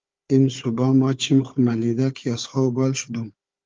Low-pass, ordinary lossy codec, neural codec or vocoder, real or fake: 7.2 kHz; Opus, 32 kbps; codec, 16 kHz, 4 kbps, FunCodec, trained on Chinese and English, 50 frames a second; fake